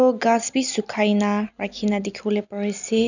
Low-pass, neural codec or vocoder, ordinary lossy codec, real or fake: 7.2 kHz; none; none; real